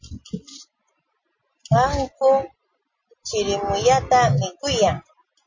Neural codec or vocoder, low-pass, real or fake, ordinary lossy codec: none; 7.2 kHz; real; MP3, 32 kbps